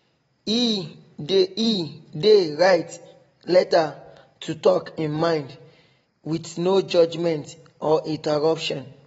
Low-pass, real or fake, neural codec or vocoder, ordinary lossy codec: 9.9 kHz; real; none; AAC, 24 kbps